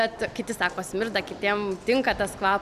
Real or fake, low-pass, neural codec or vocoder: real; 14.4 kHz; none